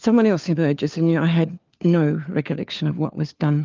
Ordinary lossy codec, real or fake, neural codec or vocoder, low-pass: Opus, 32 kbps; fake; codec, 16 kHz, 4 kbps, FunCodec, trained on LibriTTS, 50 frames a second; 7.2 kHz